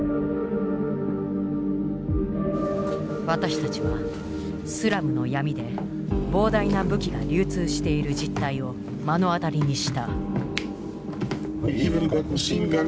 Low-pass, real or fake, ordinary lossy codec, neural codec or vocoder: none; real; none; none